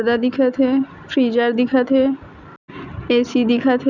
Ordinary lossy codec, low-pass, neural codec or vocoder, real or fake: none; 7.2 kHz; none; real